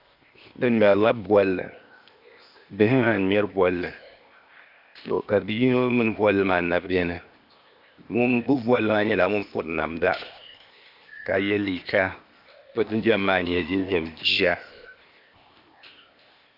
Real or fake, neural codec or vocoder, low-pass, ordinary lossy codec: fake; codec, 16 kHz, 0.8 kbps, ZipCodec; 5.4 kHz; Opus, 64 kbps